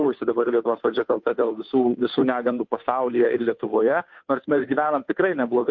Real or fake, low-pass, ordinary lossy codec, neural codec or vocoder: fake; 7.2 kHz; MP3, 48 kbps; codec, 16 kHz, 2 kbps, FunCodec, trained on Chinese and English, 25 frames a second